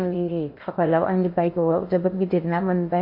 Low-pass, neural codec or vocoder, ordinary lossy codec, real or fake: 5.4 kHz; codec, 16 kHz in and 24 kHz out, 0.8 kbps, FocalCodec, streaming, 65536 codes; MP3, 32 kbps; fake